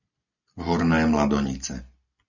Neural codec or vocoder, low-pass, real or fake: none; 7.2 kHz; real